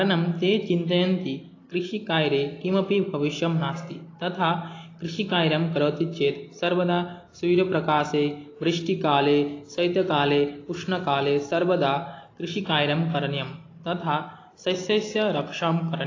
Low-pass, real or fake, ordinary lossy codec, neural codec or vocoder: 7.2 kHz; real; AAC, 32 kbps; none